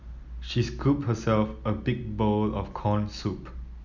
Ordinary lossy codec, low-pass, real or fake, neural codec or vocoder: none; 7.2 kHz; real; none